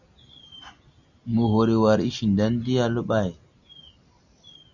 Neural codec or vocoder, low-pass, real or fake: none; 7.2 kHz; real